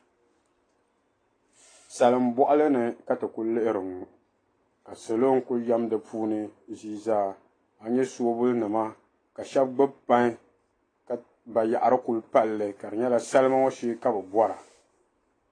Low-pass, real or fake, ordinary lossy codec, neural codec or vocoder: 9.9 kHz; real; AAC, 32 kbps; none